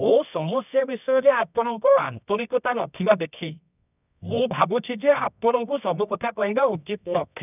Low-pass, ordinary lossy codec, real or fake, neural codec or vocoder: 3.6 kHz; none; fake; codec, 24 kHz, 0.9 kbps, WavTokenizer, medium music audio release